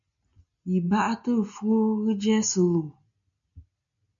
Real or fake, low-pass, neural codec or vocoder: real; 7.2 kHz; none